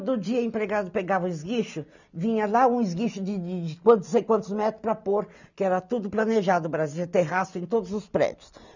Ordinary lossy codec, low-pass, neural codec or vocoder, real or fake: none; 7.2 kHz; none; real